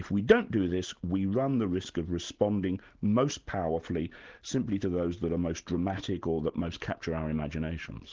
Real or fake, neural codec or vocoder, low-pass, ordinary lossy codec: real; none; 7.2 kHz; Opus, 16 kbps